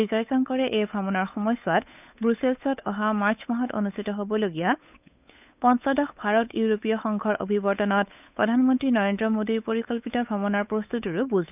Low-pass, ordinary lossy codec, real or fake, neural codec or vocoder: 3.6 kHz; none; fake; codec, 16 kHz, 8 kbps, FunCodec, trained on Chinese and English, 25 frames a second